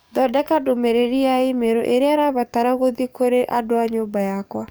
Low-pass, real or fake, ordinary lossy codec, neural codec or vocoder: none; fake; none; codec, 44.1 kHz, 7.8 kbps, DAC